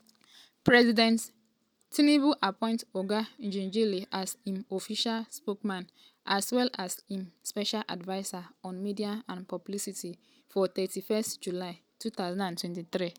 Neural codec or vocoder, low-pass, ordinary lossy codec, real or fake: none; none; none; real